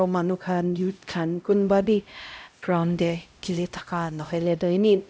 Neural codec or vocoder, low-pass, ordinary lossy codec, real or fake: codec, 16 kHz, 0.5 kbps, X-Codec, HuBERT features, trained on LibriSpeech; none; none; fake